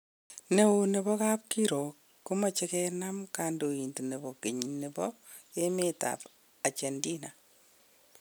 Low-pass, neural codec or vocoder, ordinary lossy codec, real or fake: none; none; none; real